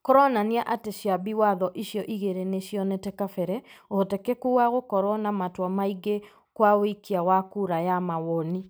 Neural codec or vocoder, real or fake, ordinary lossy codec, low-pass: none; real; none; none